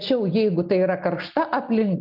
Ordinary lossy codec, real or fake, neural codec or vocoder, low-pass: Opus, 24 kbps; real; none; 5.4 kHz